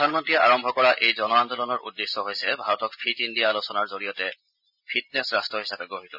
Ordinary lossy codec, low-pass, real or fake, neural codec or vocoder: none; 5.4 kHz; real; none